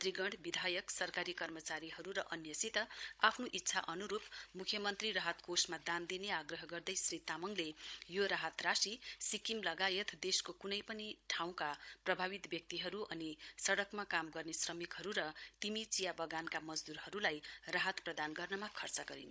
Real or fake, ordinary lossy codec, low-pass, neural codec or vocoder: fake; none; none; codec, 16 kHz, 16 kbps, FunCodec, trained on Chinese and English, 50 frames a second